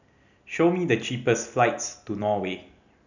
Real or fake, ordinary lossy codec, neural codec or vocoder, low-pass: real; none; none; 7.2 kHz